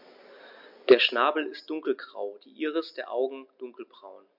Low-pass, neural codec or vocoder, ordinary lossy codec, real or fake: 5.4 kHz; none; MP3, 48 kbps; real